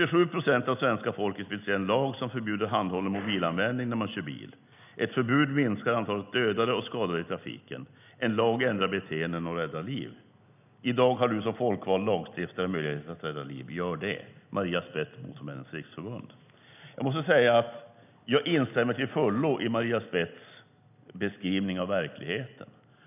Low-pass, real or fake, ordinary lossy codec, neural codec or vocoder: 3.6 kHz; real; none; none